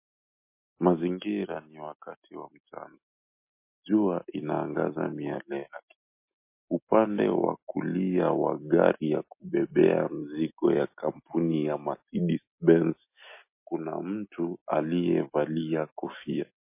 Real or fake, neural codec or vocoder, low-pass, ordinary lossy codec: real; none; 3.6 kHz; MP3, 24 kbps